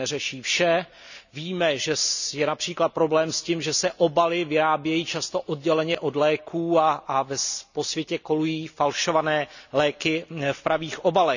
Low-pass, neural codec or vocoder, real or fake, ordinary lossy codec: 7.2 kHz; none; real; none